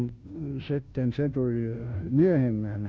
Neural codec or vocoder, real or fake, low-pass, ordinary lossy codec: codec, 16 kHz, 0.5 kbps, FunCodec, trained on Chinese and English, 25 frames a second; fake; none; none